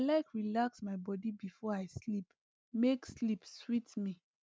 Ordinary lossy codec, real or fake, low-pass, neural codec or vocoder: none; real; none; none